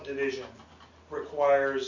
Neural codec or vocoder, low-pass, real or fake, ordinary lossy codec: none; 7.2 kHz; real; AAC, 48 kbps